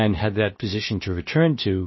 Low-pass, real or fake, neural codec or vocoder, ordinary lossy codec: 7.2 kHz; fake; codec, 16 kHz, about 1 kbps, DyCAST, with the encoder's durations; MP3, 24 kbps